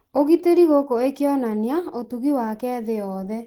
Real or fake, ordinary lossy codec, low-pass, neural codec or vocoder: real; Opus, 16 kbps; 19.8 kHz; none